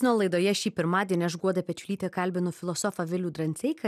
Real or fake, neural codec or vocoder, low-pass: real; none; 14.4 kHz